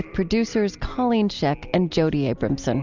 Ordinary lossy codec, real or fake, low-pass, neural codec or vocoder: Opus, 64 kbps; real; 7.2 kHz; none